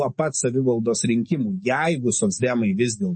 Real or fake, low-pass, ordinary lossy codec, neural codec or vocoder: fake; 9.9 kHz; MP3, 32 kbps; codec, 44.1 kHz, 7.8 kbps, DAC